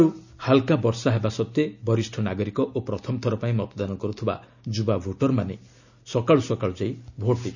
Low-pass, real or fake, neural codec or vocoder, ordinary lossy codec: 7.2 kHz; real; none; none